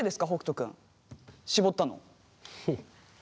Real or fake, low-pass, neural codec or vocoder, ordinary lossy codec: real; none; none; none